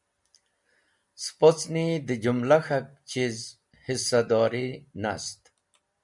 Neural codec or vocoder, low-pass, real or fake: none; 10.8 kHz; real